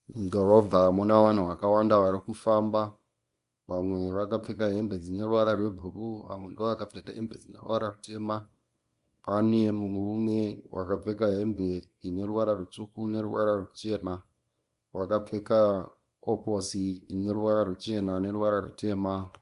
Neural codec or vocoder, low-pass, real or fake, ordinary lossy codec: codec, 24 kHz, 0.9 kbps, WavTokenizer, small release; 10.8 kHz; fake; Opus, 64 kbps